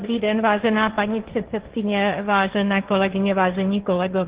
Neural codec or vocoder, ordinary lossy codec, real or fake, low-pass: codec, 16 kHz, 1.1 kbps, Voila-Tokenizer; Opus, 16 kbps; fake; 3.6 kHz